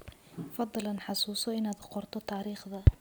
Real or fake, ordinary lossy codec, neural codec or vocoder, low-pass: real; none; none; none